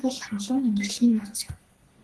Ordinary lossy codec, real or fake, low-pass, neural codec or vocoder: Opus, 16 kbps; fake; 10.8 kHz; codec, 32 kHz, 1.9 kbps, SNAC